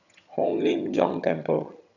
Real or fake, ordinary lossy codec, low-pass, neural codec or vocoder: fake; none; 7.2 kHz; vocoder, 22.05 kHz, 80 mel bands, HiFi-GAN